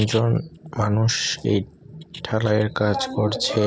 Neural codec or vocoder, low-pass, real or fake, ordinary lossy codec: none; none; real; none